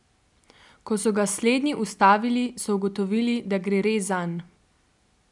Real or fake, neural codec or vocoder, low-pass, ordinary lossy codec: real; none; 10.8 kHz; none